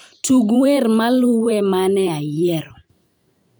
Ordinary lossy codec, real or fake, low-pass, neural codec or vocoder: none; fake; none; vocoder, 44.1 kHz, 128 mel bands every 512 samples, BigVGAN v2